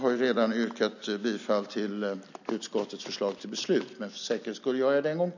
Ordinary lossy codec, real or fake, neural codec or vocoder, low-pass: none; real; none; 7.2 kHz